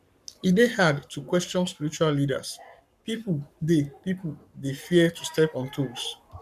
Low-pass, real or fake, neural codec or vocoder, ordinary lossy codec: 14.4 kHz; fake; codec, 44.1 kHz, 7.8 kbps, Pupu-Codec; none